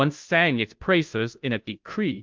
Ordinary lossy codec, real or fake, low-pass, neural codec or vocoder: Opus, 32 kbps; fake; 7.2 kHz; codec, 16 kHz, 0.5 kbps, FunCodec, trained on Chinese and English, 25 frames a second